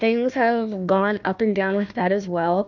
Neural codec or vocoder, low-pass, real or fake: codec, 16 kHz, 1 kbps, FunCodec, trained on Chinese and English, 50 frames a second; 7.2 kHz; fake